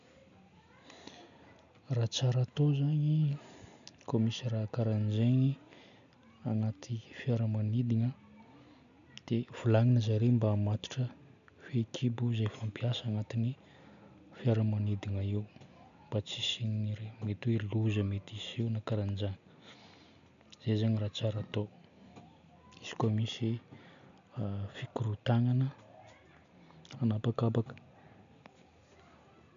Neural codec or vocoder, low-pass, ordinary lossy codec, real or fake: none; 7.2 kHz; none; real